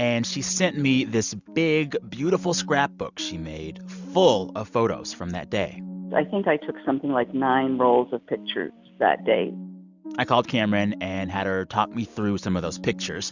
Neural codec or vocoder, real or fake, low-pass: none; real; 7.2 kHz